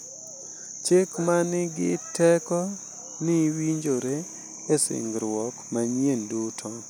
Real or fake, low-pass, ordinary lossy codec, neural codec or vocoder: real; none; none; none